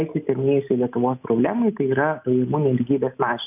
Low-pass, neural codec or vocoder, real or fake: 3.6 kHz; none; real